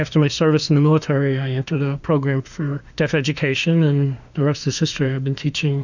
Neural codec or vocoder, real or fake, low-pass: autoencoder, 48 kHz, 32 numbers a frame, DAC-VAE, trained on Japanese speech; fake; 7.2 kHz